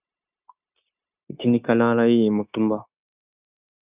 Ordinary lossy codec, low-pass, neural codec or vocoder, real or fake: Opus, 64 kbps; 3.6 kHz; codec, 16 kHz, 0.9 kbps, LongCat-Audio-Codec; fake